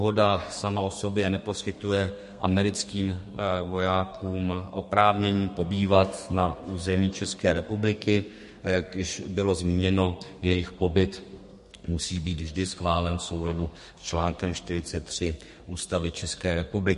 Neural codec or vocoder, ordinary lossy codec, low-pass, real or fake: codec, 32 kHz, 1.9 kbps, SNAC; MP3, 48 kbps; 14.4 kHz; fake